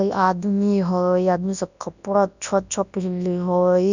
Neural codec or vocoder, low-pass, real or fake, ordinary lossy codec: codec, 24 kHz, 0.9 kbps, WavTokenizer, large speech release; 7.2 kHz; fake; none